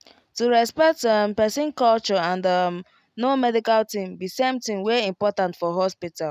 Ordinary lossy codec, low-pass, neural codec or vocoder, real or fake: none; 14.4 kHz; none; real